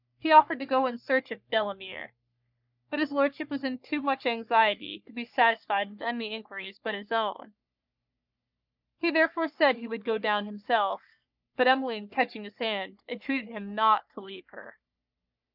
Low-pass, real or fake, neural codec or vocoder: 5.4 kHz; fake; codec, 44.1 kHz, 3.4 kbps, Pupu-Codec